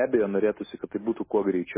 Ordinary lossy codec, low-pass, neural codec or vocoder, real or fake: MP3, 16 kbps; 3.6 kHz; none; real